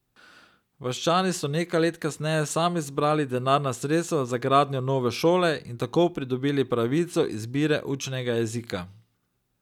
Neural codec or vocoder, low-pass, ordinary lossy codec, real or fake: none; 19.8 kHz; none; real